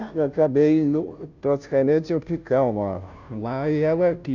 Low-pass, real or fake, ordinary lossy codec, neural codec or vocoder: 7.2 kHz; fake; none; codec, 16 kHz, 0.5 kbps, FunCodec, trained on Chinese and English, 25 frames a second